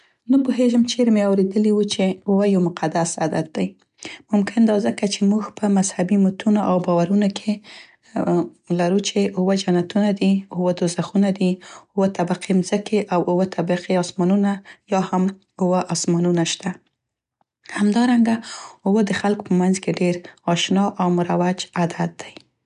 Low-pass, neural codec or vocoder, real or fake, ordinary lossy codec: 10.8 kHz; none; real; none